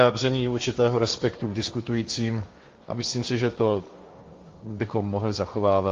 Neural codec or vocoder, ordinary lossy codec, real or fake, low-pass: codec, 16 kHz, 1.1 kbps, Voila-Tokenizer; Opus, 32 kbps; fake; 7.2 kHz